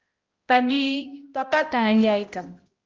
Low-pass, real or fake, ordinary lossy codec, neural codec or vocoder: 7.2 kHz; fake; Opus, 32 kbps; codec, 16 kHz, 0.5 kbps, X-Codec, HuBERT features, trained on balanced general audio